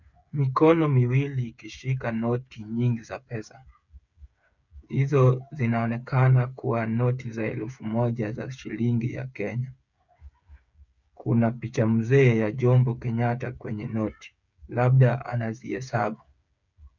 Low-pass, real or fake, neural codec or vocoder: 7.2 kHz; fake; codec, 16 kHz, 8 kbps, FreqCodec, smaller model